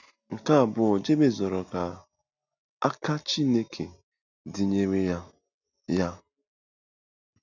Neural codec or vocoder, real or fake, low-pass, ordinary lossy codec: none; real; 7.2 kHz; none